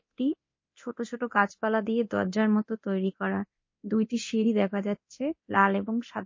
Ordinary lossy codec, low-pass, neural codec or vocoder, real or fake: MP3, 32 kbps; 7.2 kHz; codec, 24 kHz, 0.9 kbps, DualCodec; fake